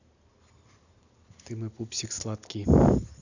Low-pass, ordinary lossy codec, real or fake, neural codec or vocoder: 7.2 kHz; none; real; none